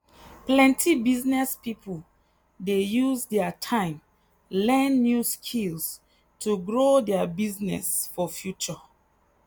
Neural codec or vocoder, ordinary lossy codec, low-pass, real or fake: none; none; none; real